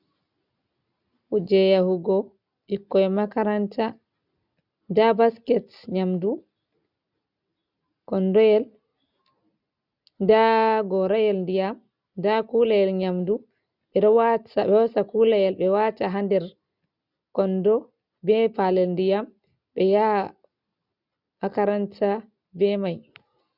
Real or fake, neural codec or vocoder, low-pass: real; none; 5.4 kHz